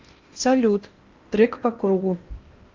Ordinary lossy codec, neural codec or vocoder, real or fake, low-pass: Opus, 32 kbps; codec, 16 kHz in and 24 kHz out, 0.8 kbps, FocalCodec, streaming, 65536 codes; fake; 7.2 kHz